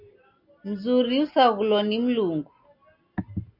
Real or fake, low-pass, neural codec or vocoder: real; 5.4 kHz; none